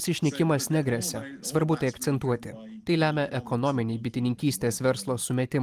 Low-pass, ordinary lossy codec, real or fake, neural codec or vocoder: 14.4 kHz; Opus, 32 kbps; real; none